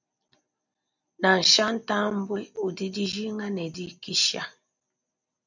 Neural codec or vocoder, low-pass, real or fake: none; 7.2 kHz; real